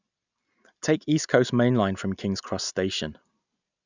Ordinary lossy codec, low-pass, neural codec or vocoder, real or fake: none; 7.2 kHz; none; real